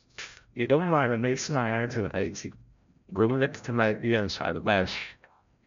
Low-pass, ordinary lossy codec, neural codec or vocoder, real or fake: 7.2 kHz; MP3, 64 kbps; codec, 16 kHz, 0.5 kbps, FreqCodec, larger model; fake